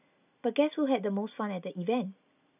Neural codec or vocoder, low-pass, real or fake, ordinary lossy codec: none; 3.6 kHz; real; none